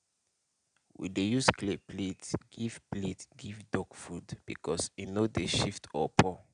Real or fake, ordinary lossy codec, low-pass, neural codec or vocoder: real; none; 9.9 kHz; none